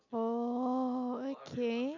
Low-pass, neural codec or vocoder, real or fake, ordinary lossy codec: 7.2 kHz; none; real; none